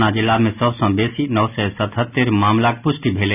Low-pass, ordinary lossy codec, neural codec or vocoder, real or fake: 3.6 kHz; none; none; real